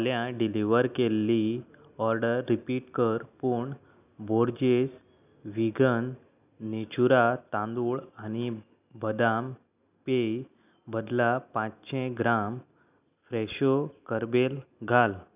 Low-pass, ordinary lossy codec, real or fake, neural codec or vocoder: 3.6 kHz; none; real; none